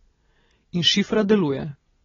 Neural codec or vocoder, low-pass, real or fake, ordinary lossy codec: none; 7.2 kHz; real; AAC, 24 kbps